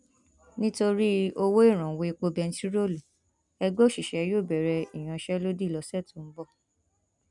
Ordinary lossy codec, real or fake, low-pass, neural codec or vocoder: none; real; 10.8 kHz; none